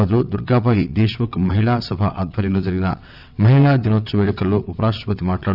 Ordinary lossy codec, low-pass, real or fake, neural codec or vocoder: none; 5.4 kHz; fake; vocoder, 22.05 kHz, 80 mel bands, WaveNeXt